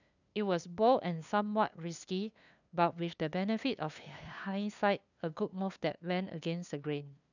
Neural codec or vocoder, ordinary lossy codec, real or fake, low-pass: codec, 16 kHz, 2 kbps, FunCodec, trained on LibriTTS, 25 frames a second; none; fake; 7.2 kHz